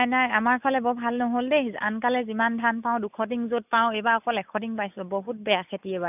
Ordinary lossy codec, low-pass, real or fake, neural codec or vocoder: none; 3.6 kHz; real; none